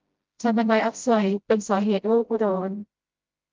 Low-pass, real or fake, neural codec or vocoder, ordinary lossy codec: 7.2 kHz; fake; codec, 16 kHz, 0.5 kbps, FreqCodec, smaller model; Opus, 24 kbps